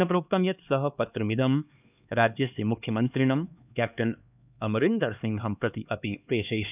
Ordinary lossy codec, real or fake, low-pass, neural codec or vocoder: none; fake; 3.6 kHz; codec, 16 kHz, 2 kbps, X-Codec, HuBERT features, trained on LibriSpeech